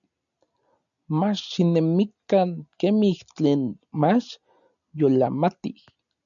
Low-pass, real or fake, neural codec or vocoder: 7.2 kHz; real; none